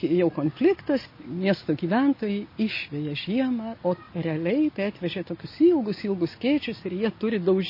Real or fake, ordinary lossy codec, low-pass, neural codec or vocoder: real; MP3, 24 kbps; 5.4 kHz; none